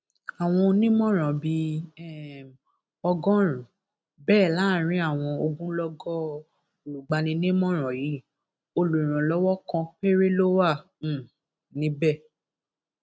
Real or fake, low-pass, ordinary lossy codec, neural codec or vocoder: real; none; none; none